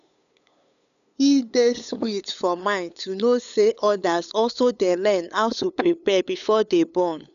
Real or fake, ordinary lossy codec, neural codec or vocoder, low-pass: fake; none; codec, 16 kHz, 8 kbps, FunCodec, trained on LibriTTS, 25 frames a second; 7.2 kHz